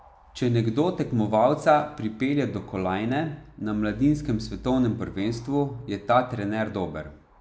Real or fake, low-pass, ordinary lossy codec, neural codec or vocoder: real; none; none; none